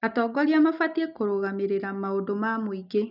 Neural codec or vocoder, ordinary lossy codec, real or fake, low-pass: none; none; real; 5.4 kHz